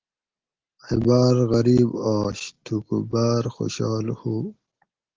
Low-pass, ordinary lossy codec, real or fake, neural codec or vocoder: 7.2 kHz; Opus, 16 kbps; real; none